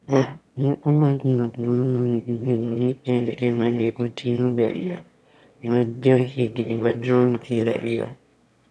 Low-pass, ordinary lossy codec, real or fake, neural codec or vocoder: none; none; fake; autoencoder, 22.05 kHz, a latent of 192 numbers a frame, VITS, trained on one speaker